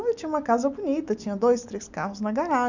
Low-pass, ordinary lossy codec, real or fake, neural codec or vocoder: 7.2 kHz; none; real; none